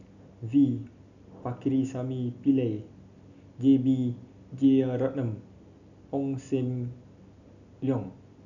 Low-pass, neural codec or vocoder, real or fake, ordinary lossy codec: 7.2 kHz; none; real; none